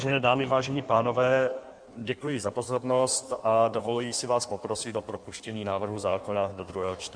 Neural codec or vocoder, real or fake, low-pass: codec, 16 kHz in and 24 kHz out, 1.1 kbps, FireRedTTS-2 codec; fake; 9.9 kHz